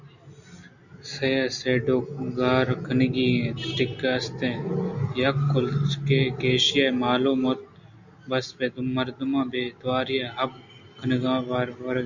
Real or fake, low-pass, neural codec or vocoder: real; 7.2 kHz; none